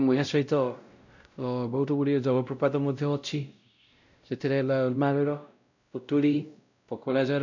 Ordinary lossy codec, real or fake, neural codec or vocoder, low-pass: none; fake; codec, 16 kHz, 0.5 kbps, X-Codec, WavLM features, trained on Multilingual LibriSpeech; 7.2 kHz